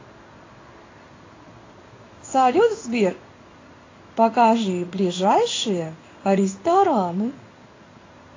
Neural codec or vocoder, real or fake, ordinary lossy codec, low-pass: codec, 16 kHz in and 24 kHz out, 1 kbps, XY-Tokenizer; fake; AAC, 32 kbps; 7.2 kHz